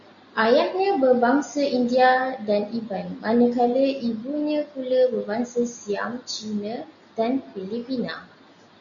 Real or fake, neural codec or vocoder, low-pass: real; none; 7.2 kHz